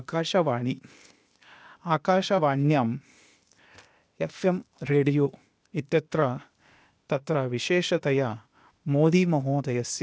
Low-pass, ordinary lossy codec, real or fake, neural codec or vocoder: none; none; fake; codec, 16 kHz, 0.8 kbps, ZipCodec